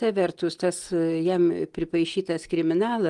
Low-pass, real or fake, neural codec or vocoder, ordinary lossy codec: 10.8 kHz; real; none; Opus, 24 kbps